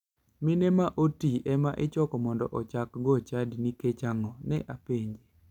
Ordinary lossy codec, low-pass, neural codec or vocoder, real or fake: none; 19.8 kHz; none; real